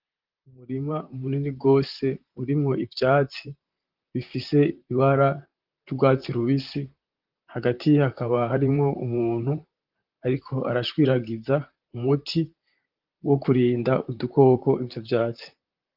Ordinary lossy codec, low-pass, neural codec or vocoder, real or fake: Opus, 24 kbps; 5.4 kHz; vocoder, 44.1 kHz, 128 mel bands, Pupu-Vocoder; fake